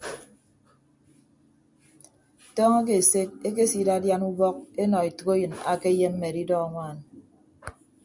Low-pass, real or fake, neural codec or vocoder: 10.8 kHz; real; none